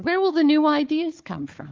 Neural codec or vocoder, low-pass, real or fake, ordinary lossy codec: codec, 16 kHz, 4 kbps, FunCodec, trained on Chinese and English, 50 frames a second; 7.2 kHz; fake; Opus, 24 kbps